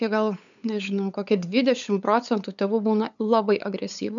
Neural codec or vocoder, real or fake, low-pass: codec, 16 kHz, 8 kbps, FunCodec, trained on LibriTTS, 25 frames a second; fake; 7.2 kHz